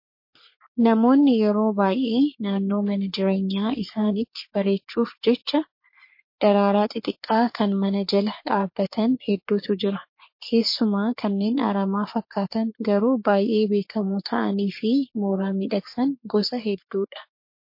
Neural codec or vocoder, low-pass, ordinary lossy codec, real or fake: codec, 44.1 kHz, 3.4 kbps, Pupu-Codec; 5.4 kHz; MP3, 32 kbps; fake